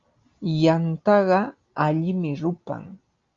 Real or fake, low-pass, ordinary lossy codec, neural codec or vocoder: real; 7.2 kHz; Opus, 32 kbps; none